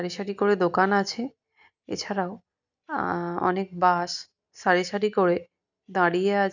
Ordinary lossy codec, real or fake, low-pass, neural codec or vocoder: none; real; 7.2 kHz; none